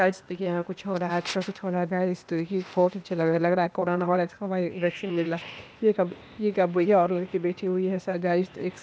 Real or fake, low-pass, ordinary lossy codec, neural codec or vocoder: fake; none; none; codec, 16 kHz, 0.8 kbps, ZipCodec